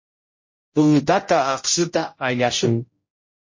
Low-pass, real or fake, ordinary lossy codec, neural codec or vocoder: 7.2 kHz; fake; MP3, 32 kbps; codec, 16 kHz, 0.5 kbps, X-Codec, HuBERT features, trained on general audio